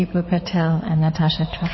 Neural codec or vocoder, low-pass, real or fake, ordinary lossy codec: codec, 16 kHz in and 24 kHz out, 2.2 kbps, FireRedTTS-2 codec; 7.2 kHz; fake; MP3, 24 kbps